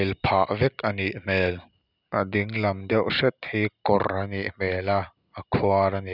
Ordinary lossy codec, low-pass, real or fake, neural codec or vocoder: none; 5.4 kHz; real; none